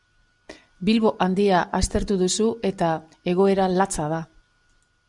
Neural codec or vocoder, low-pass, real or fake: none; 10.8 kHz; real